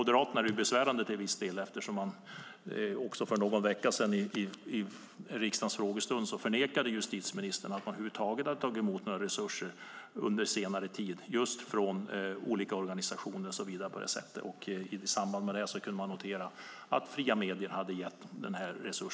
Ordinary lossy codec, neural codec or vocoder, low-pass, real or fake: none; none; none; real